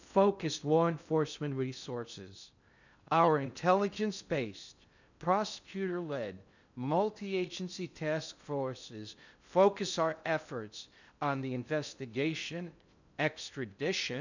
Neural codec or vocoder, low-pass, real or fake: codec, 16 kHz in and 24 kHz out, 0.6 kbps, FocalCodec, streaming, 2048 codes; 7.2 kHz; fake